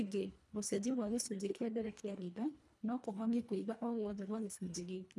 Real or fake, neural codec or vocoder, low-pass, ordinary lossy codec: fake; codec, 24 kHz, 1.5 kbps, HILCodec; none; none